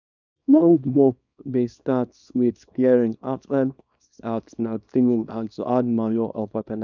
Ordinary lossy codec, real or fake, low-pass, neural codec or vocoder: none; fake; 7.2 kHz; codec, 24 kHz, 0.9 kbps, WavTokenizer, small release